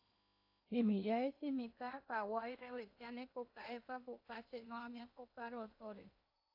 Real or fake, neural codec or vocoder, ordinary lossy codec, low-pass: fake; codec, 16 kHz in and 24 kHz out, 0.8 kbps, FocalCodec, streaming, 65536 codes; AAC, 32 kbps; 5.4 kHz